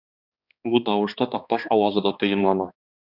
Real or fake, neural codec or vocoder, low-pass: fake; codec, 16 kHz, 2 kbps, X-Codec, HuBERT features, trained on balanced general audio; 5.4 kHz